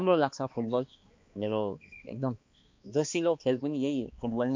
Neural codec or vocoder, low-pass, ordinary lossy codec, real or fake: codec, 16 kHz, 2 kbps, X-Codec, HuBERT features, trained on balanced general audio; 7.2 kHz; MP3, 48 kbps; fake